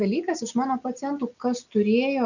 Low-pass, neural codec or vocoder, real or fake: 7.2 kHz; none; real